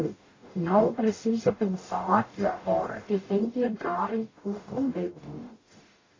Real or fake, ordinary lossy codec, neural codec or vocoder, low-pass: fake; AAC, 32 kbps; codec, 44.1 kHz, 0.9 kbps, DAC; 7.2 kHz